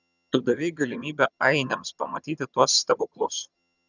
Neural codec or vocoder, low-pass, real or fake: vocoder, 22.05 kHz, 80 mel bands, HiFi-GAN; 7.2 kHz; fake